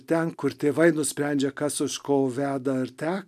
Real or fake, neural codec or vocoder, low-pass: real; none; 14.4 kHz